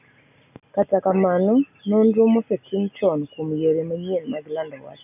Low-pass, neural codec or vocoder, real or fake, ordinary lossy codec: 3.6 kHz; none; real; none